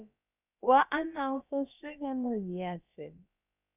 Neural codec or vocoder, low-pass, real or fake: codec, 16 kHz, about 1 kbps, DyCAST, with the encoder's durations; 3.6 kHz; fake